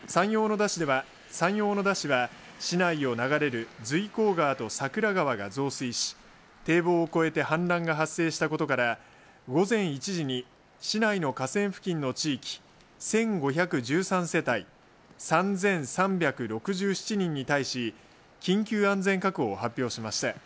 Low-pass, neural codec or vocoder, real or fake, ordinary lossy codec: none; none; real; none